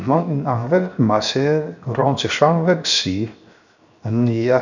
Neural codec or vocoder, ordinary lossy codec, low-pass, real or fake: codec, 16 kHz, 0.7 kbps, FocalCodec; none; 7.2 kHz; fake